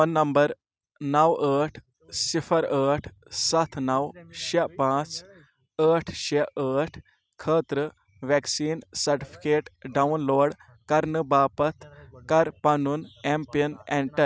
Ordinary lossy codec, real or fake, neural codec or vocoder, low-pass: none; real; none; none